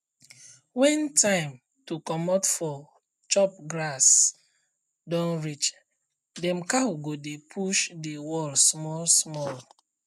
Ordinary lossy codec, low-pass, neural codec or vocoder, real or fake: none; 14.4 kHz; none; real